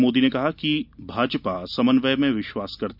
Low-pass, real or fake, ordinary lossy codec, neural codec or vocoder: 5.4 kHz; real; none; none